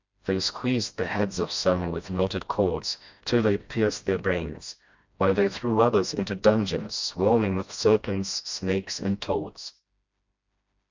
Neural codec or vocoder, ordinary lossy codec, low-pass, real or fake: codec, 16 kHz, 1 kbps, FreqCodec, smaller model; MP3, 64 kbps; 7.2 kHz; fake